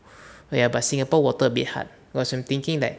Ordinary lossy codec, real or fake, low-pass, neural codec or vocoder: none; real; none; none